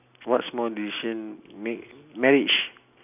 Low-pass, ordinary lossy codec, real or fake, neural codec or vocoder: 3.6 kHz; none; real; none